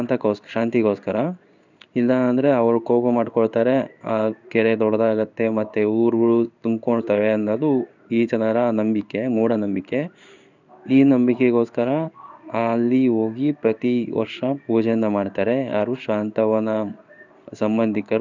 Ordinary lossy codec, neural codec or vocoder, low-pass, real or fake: none; codec, 16 kHz in and 24 kHz out, 1 kbps, XY-Tokenizer; 7.2 kHz; fake